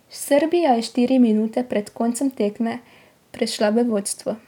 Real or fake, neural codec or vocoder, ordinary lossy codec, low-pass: real; none; none; 19.8 kHz